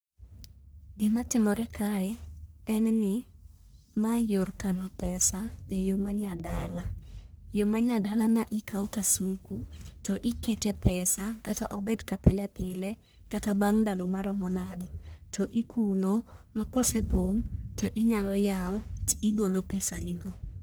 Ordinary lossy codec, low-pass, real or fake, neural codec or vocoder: none; none; fake; codec, 44.1 kHz, 1.7 kbps, Pupu-Codec